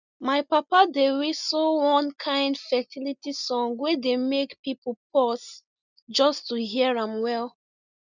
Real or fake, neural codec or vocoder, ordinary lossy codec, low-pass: real; none; none; 7.2 kHz